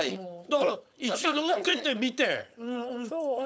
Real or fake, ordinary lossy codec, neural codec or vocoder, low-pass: fake; none; codec, 16 kHz, 4.8 kbps, FACodec; none